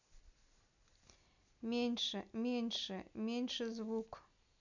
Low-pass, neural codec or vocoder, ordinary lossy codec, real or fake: 7.2 kHz; none; none; real